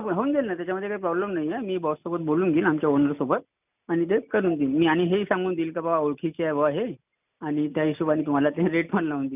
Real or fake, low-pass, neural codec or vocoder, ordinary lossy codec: real; 3.6 kHz; none; none